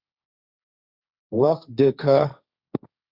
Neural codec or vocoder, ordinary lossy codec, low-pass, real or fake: codec, 16 kHz, 1.1 kbps, Voila-Tokenizer; Opus, 64 kbps; 5.4 kHz; fake